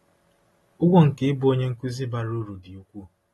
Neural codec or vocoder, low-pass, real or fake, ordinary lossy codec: none; 19.8 kHz; real; AAC, 32 kbps